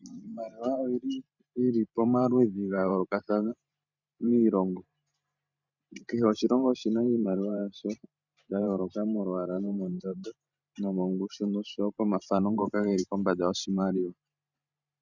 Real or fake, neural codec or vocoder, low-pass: fake; vocoder, 44.1 kHz, 128 mel bands every 512 samples, BigVGAN v2; 7.2 kHz